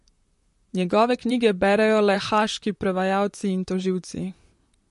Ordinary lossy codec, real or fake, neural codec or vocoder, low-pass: MP3, 48 kbps; fake; vocoder, 44.1 kHz, 128 mel bands, Pupu-Vocoder; 14.4 kHz